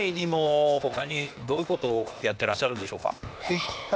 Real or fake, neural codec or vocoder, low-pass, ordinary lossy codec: fake; codec, 16 kHz, 0.8 kbps, ZipCodec; none; none